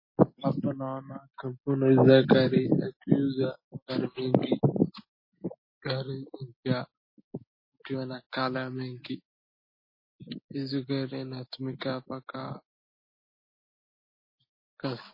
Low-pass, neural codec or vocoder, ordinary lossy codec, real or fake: 5.4 kHz; none; MP3, 24 kbps; real